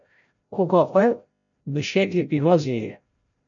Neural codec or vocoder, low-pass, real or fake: codec, 16 kHz, 0.5 kbps, FreqCodec, larger model; 7.2 kHz; fake